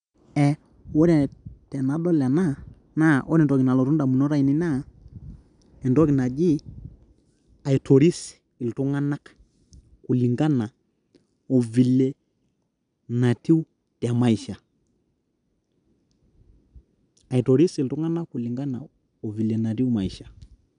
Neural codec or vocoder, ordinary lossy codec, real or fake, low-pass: none; none; real; 9.9 kHz